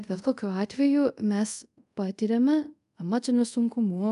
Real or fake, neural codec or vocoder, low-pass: fake; codec, 24 kHz, 0.5 kbps, DualCodec; 10.8 kHz